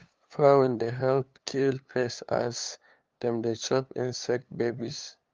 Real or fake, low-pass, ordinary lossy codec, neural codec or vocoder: fake; 7.2 kHz; Opus, 24 kbps; codec, 16 kHz, 2 kbps, FunCodec, trained on LibriTTS, 25 frames a second